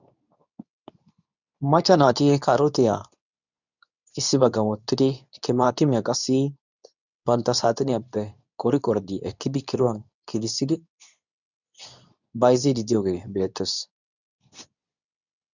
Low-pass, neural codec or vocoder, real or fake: 7.2 kHz; codec, 24 kHz, 0.9 kbps, WavTokenizer, medium speech release version 2; fake